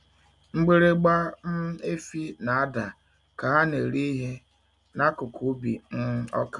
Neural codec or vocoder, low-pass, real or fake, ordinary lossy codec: none; 10.8 kHz; real; none